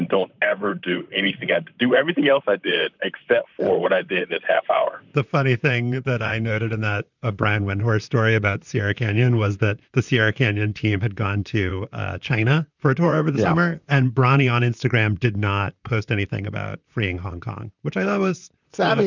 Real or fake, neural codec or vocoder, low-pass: fake; vocoder, 44.1 kHz, 128 mel bands, Pupu-Vocoder; 7.2 kHz